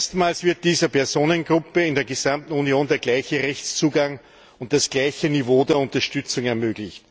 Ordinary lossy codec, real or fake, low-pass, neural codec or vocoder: none; real; none; none